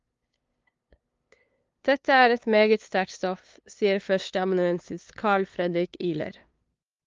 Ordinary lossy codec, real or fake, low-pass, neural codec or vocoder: Opus, 32 kbps; fake; 7.2 kHz; codec, 16 kHz, 2 kbps, FunCodec, trained on LibriTTS, 25 frames a second